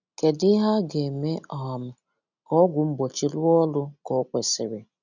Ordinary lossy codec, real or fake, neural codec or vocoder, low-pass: none; real; none; 7.2 kHz